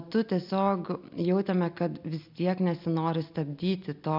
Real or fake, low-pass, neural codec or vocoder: real; 5.4 kHz; none